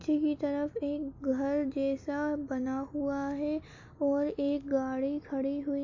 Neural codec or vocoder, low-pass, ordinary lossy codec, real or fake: none; 7.2 kHz; AAC, 48 kbps; real